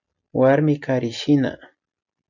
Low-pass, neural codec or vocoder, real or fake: 7.2 kHz; none; real